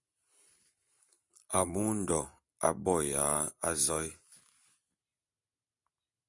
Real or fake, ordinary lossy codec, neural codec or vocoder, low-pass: real; Opus, 64 kbps; none; 10.8 kHz